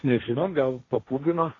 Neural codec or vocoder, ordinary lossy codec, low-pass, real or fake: codec, 16 kHz, 1.1 kbps, Voila-Tokenizer; AAC, 32 kbps; 7.2 kHz; fake